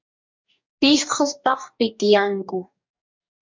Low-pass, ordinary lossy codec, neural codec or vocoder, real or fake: 7.2 kHz; MP3, 64 kbps; codec, 44.1 kHz, 2.6 kbps, DAC; fake